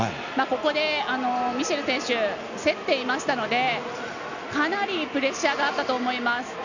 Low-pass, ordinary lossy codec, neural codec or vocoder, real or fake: 7.2 kHz; none; none; real